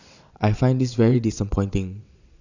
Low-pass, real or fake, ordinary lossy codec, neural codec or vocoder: 7.2 kHz; fake; none; vocoder, 22.05 kHz, 80 mel bands, WaveNeXt